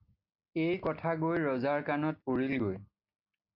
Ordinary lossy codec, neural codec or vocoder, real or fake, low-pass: AAC, 48 kbps; none; real; 5.4 kHz